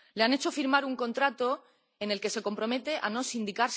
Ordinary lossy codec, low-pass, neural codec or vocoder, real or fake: none; none; none; real